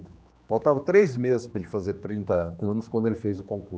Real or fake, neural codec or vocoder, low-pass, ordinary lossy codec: fake; codec, 16 kHz, 2 kbps, X-Codec, HuBERT features, trained on general audio; none; none